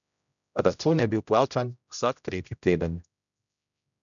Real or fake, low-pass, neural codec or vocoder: fake; 7.2 kHz; codec, 16 kHz, 0.5 kbps, X-Codec, HuBERT features, trained on general audio